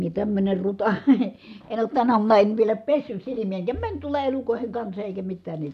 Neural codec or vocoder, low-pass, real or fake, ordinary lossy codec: none; 14.4 kHz; real; none